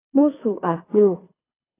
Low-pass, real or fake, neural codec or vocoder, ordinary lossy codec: 3.6 kHz; fake; codec, 24 kHz, 3 kbps, HILCodec; AAC, 16 kbps